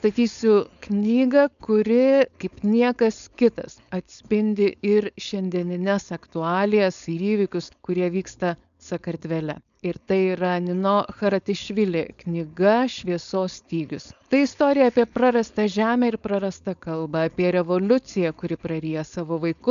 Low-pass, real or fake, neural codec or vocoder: 7.2 kHz; fake; codec, 16 kHz, 4.8 kbps, FACodec